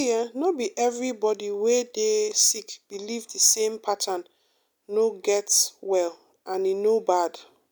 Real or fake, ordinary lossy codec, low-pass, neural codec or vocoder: real; none; none; none